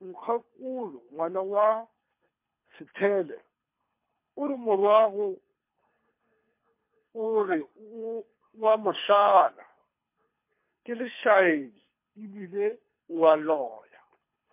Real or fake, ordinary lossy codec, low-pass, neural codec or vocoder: fake; MP3, 24 kbps; 3.6 kHz; codec, 24 kHz, 3 kbps, HILCodec